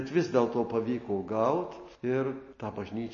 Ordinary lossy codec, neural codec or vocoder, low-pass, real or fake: MP3, 32 kbps; none; 7.2 kHz; real